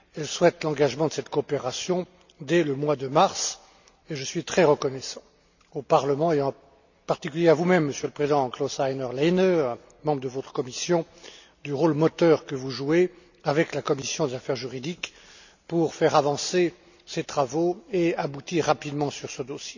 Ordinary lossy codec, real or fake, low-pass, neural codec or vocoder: none; real; 7.2 kHz; none